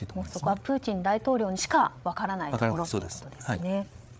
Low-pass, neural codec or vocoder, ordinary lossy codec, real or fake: none; codec, 16 kHz, 8 kbps, FreqCodec, larger model; none; fake